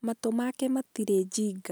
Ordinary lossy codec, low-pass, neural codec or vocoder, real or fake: none; none; none; real